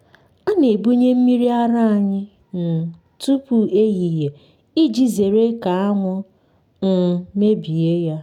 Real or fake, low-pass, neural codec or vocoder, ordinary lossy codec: real; 19.8 kHz; none; none